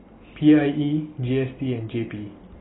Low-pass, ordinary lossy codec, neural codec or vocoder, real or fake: 7.2 kHz; AAC, 16 kbps; none; real